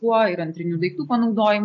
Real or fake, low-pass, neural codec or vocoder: real; 7.2 kHz; none